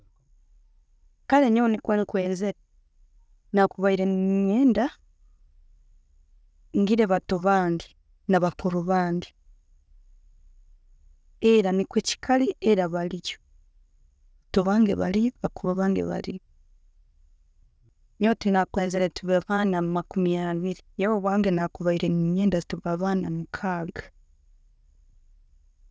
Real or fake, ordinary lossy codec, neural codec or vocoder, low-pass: real; none; none; none